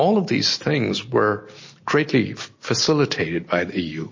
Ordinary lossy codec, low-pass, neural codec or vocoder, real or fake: MP3, 32 kbps; 7.2 kHz; none; real